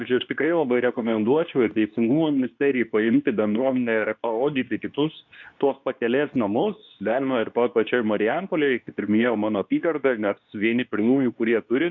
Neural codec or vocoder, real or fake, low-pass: codec, 24 kHz, 0.9 kbps, WavTokenizer, medium speech release version 2; fake; 7.2 kHz